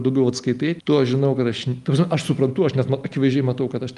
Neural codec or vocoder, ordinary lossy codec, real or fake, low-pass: none; Opus, 32 kbps; real; 10.8 kHz